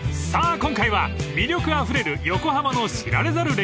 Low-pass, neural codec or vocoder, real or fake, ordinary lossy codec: none; none; real; none